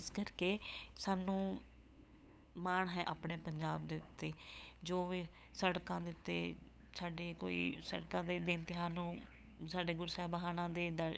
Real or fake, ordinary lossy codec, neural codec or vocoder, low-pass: fake; none; codec, 16 kHz, 8 kbps, FunCodec, trained on LibriTTS, 25 frames a second; none